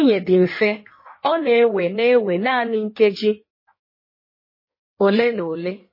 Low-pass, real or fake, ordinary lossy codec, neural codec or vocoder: 5.4 kHz; fake; MP3, 24 kbps; codec, 16 kHz in and 24 kHz out, 1.1 kbps, FireRedTTS-2 codec